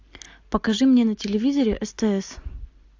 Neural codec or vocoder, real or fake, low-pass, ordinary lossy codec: none; real; 7.2 kHz; AAC, 48 kbps